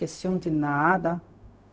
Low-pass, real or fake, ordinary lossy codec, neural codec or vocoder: none; fake; none; codec, 16 kHz, 0.4 kbps, LongCat-Audio-Codec